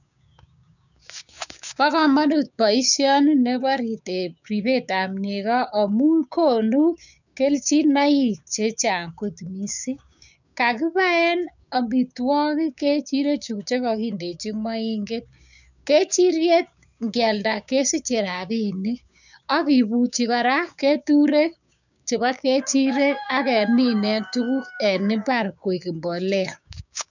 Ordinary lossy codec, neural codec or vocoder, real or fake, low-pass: none; codec, 16 kHz, 6 kbps, DAC; fake; 7.2 kHz